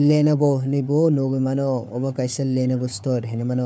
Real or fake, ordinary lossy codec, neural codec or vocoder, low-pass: fake; none; codec, 16 kHz, 4 kbps, FunCodec, trained on Chinese and English, 50 frames a second; none